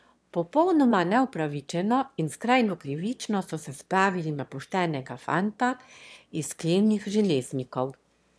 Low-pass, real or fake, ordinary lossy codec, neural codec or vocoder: none; fake; none; autoencoder, 22.05 kHz, a latent of 192 numbers a frame, VITS, trained on one speaker